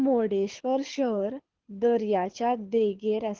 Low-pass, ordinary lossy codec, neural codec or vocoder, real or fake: 7.2 kHz; Opus, 16 kbps; codec, 24 kHz, 6 kbps, HILCodec; fake